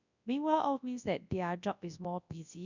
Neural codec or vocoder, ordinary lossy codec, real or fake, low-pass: codec, 24 kHz, 0.9 kbps, WavTokenizer, large speech release; none; fake; 7.2 kHz